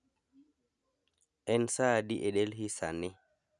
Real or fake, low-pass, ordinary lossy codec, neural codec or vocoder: real; 10.8 kHz; none; none